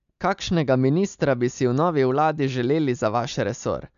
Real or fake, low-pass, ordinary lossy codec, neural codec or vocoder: real; 7.2 kHz; none; none